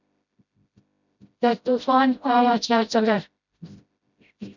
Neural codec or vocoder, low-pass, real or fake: codec, 16 kHz, 0.5 kbps, FreqCodec, smaller model; 7.2 kHz; fake